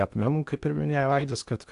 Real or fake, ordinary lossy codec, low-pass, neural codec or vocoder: fake; AAC, 64 kbps; 10.8 kHz; codec, 16 kHz in and 24 kHz out, 0.8 kbps, FocalCodec, streaming, 65536 codes